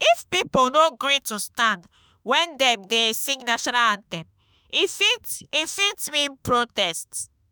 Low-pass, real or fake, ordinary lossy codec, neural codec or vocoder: none; fake; none; autoencoder, 48 kHz, 32 numbers a frame, DAC-VAE, trained on Japanese speech